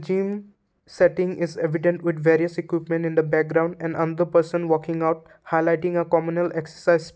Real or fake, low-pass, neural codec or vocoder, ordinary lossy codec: real; none; none; none